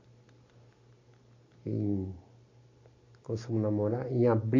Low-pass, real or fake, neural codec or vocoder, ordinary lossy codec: 7.2 kHz; real; none; MP3, 32 kbps